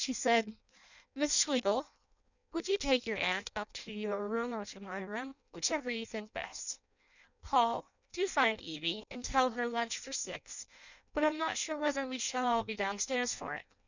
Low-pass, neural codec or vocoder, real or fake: 7.2 kHz; codec, 16 kHz in and 24 kHz out, 0.6 kbps, FireRedTTS-2 codec; fake